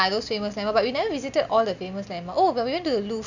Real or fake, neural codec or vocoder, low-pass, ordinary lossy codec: real; none; 7.2 kHz; none